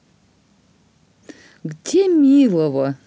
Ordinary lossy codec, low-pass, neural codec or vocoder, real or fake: none; none; none; real